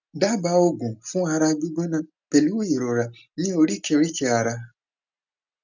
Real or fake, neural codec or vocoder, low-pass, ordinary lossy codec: real; none; 7.2 kHz; none